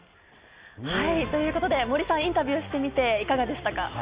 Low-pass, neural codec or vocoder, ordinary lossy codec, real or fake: 3.6 kHz; none; Opus, 64 kbps; real